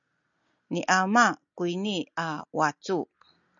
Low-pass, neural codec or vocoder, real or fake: 7.2 kHz; none; real